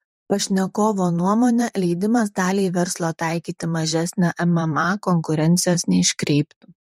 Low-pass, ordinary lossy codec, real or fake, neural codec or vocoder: 19.8 kHz; MP3, 64 kbps; fake; vocoder, 44.1 kHz, 128 mel bands, Pupu-Vocoder